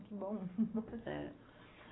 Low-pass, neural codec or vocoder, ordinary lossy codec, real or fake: 7.2 kHz; none; AAC, 16 kbps; real